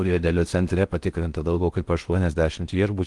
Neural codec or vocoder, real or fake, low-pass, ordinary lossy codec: codec, 16 kHz in and 24 kHz out, 0.6 kbps, FocalCodec, streaming, 4096 codes; fake; 10.8 kHz; Opus, 24 kbps